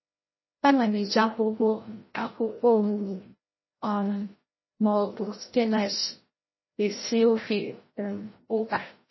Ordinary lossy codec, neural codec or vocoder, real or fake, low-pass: MP3, 24 kbps; codec, 16 kHz, 0.5 kbps, FreqCodec, larger model; fake; 7.2 kHz